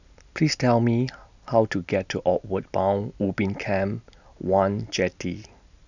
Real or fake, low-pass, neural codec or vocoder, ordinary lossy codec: real; 7.2 kHz; none; none